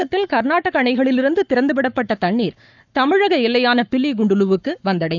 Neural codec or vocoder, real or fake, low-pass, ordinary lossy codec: codec, 44.1 kHz, 7.8 kbps, Pupu-Codec; fake; 7.2 kHz; none